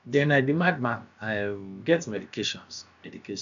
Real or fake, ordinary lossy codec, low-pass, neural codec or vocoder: fake; none; 7.2 kHz; codec, 16 kHz, about 1 kbps, DyCAST, with the encoder's durations